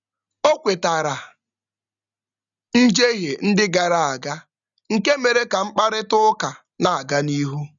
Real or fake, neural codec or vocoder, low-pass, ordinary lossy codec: real; none; 7.2 kHz; none